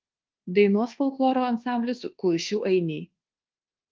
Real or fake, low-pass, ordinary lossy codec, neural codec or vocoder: fake; 7.2 kHz; Opus, 16 kbps; codec, 24 kHz, 1.2 kbps, DualCodec